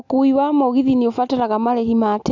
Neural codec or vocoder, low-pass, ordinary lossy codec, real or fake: none; 7.2 kHz; none; real